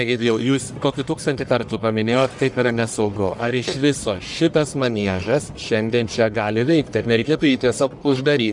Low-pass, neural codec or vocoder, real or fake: 10.8 kHz; codec, 44.1 kHz, 1.7 kbps, Pupu-Codec; fake